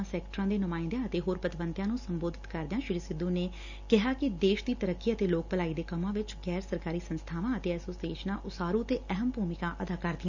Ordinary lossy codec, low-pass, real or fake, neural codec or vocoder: none; 7.2 kHz; real; none